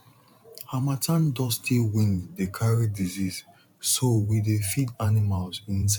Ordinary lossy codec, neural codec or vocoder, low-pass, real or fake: none; vocoder, 44.1 kHz, 128 mel bands every 512 samples, BigVGAN v2; 19.8 kHz; fake